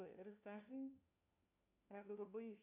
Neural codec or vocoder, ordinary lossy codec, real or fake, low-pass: codec, 16 kHz, 1 kbps, FunCodec, trained on LibriTTS, 50 frames a second; AAC, 32 kbps; fake; 3.6 kHz